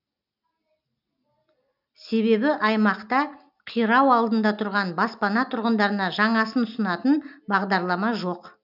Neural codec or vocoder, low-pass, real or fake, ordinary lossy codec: none; 5.4 kHz; real; none